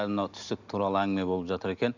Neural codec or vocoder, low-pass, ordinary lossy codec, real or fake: none; 7.2 kHz; none; real